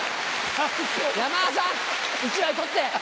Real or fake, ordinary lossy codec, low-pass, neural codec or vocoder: real; none; none; none